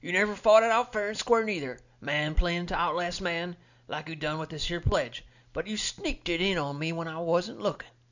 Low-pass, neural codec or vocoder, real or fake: 7.2 kHz; none; real